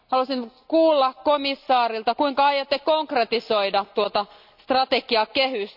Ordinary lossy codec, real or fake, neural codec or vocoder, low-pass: none; real; none; 5.4 kHz